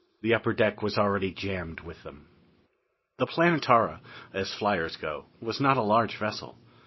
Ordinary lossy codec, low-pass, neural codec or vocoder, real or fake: MP3, 24 kbps; 7.2 kHz; none; real